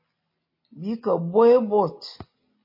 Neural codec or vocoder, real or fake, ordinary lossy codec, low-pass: none; real; MP3, 24 kbps; 5.4 kHz